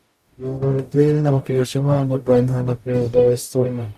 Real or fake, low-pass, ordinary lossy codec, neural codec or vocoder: fake; 14.4 kHz; Opus, 64 kbps; codec, 44.1 kHz, 0.9 kbps, DAC